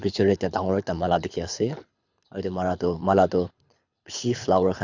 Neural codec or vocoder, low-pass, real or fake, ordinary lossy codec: codec, 24 kHz, 6 kbps, HILCodec; 7.2 kHz; fake; none